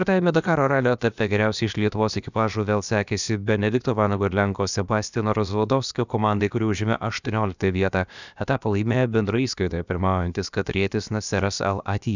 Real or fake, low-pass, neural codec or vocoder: fake; 7.2 kHz; codec, 16 kHz, about 1 kbps, DyCAST, with the encoder's durations